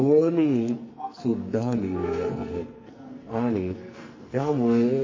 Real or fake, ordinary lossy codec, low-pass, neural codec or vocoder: fake; MP3, 32 kbps; 7.2 kHz; codec, 44.1 kHz, 2.6 kbps, SNAC